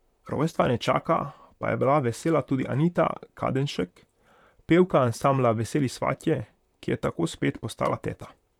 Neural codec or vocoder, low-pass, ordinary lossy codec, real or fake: vocoder, 44.1 kHz, 128 mel bands, Pupu-Vocoder; 19.8 kHz; none; fake